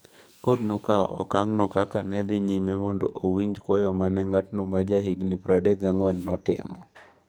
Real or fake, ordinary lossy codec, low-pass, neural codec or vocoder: fake; none; none; codec, 44.1 kHz, 2.6 kbps, SNAC